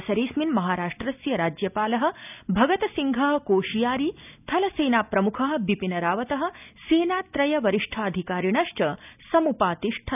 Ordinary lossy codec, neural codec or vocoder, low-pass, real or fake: none; none; 3.6 kHz; real